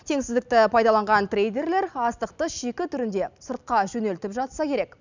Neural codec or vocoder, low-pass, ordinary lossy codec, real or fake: none; 7.2 kHz; none; real